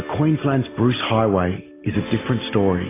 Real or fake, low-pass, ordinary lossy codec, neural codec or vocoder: real; 3.6 kHz; AAC, 16 kbps; none